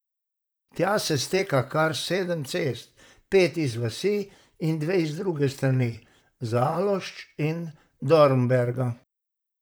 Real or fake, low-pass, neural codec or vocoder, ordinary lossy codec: fake; none; vocoder, 44.1 kHz, 128 mel bands, Pupu-Vocoder; none